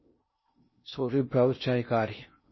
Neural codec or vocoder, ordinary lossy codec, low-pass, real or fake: codec, 16 kHz in and 24 kHz out, 0.6 kbps, FocalCodec, streaming, 2048 codes; MP3, 24 kbps; 7.2 kHz; fake